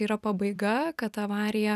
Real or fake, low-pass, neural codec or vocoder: fake; 14.4 kHz; vocoder, 44.1 kHz, 128 mel bands every 256 samples, BigVGAN v2